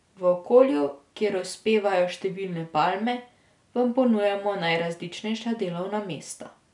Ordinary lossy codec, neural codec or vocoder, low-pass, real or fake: none; none; 10.8 kHz; real